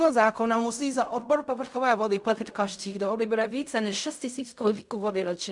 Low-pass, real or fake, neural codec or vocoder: 10.8 kHz; fake; codec, 16 kHz in and 24 kHz out, 0.4 kbps, LongCat-Audio-Codec, fine tuned four codebook decoder